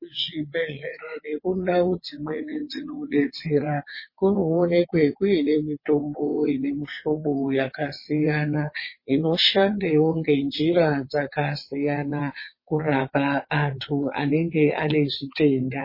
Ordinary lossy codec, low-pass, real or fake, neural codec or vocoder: MP3, 24 kbps; 5.4 kHz; fake; vocoder, 22.05 kHz, 80 mel bands, WaveNeXt